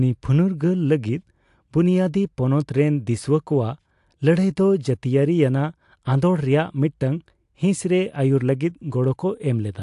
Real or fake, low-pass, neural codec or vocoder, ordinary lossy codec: real; 10.8 kHz; none; AAC, 64 kbps